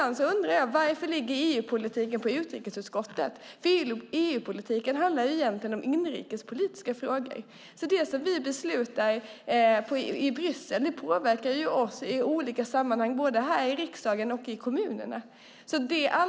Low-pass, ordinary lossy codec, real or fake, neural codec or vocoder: none; none; real; none